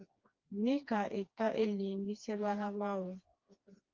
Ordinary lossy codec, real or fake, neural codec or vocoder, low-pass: Opus, 24 kbps; fake; codec, 16 kHz, 2 kbps, FreqCodec, smaller model; 7.2 kHz